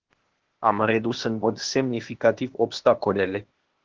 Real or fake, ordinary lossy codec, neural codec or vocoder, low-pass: fake; Opus, 16 kbps; codec, 16 kHz, 0.8 kbps, ZipCodec; 7.2 kHz